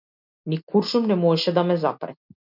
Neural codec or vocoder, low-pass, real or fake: none; 5.4 kHz; real